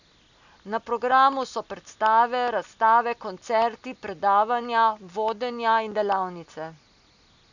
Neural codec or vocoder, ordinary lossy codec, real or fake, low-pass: none; none; real; 7.2 kHz